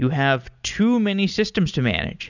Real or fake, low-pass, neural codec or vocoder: real; 7.2 kHz; none